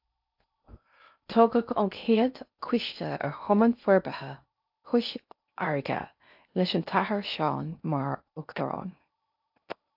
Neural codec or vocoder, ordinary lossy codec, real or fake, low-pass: codec, 16 kHz in and 24 kHz out, 0.6 kbps, FocalCodec, streaming, 4096 codes; AAC, 48 kbps; fake; 5.4 kHz